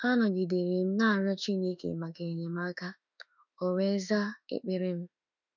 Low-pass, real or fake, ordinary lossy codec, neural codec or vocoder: 7.2 kHz; fake; none; autoencoder, 48 kHz, 32 numbers a frame, DAC-VAE, trained on Japanese speech